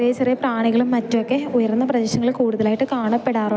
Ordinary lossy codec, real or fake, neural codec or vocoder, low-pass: none; real; none; none